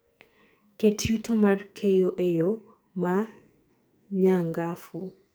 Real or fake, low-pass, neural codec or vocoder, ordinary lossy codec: fake; none; codec, 44.1 kHz, 2.6 kbps, SNAC; none